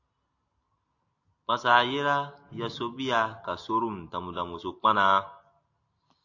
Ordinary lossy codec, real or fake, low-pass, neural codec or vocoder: AAC, 48 kbps; real; 7.2 kHz; none